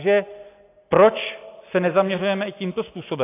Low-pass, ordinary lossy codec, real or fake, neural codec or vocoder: 3.6 kHz; AAC, 24 kbps; real; none